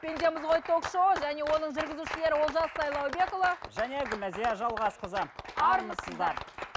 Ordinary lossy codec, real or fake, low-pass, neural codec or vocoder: none; real; none; none